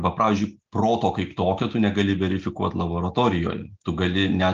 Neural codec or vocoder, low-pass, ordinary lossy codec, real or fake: none; 7.2 kHz; Opus, 24 kbps; real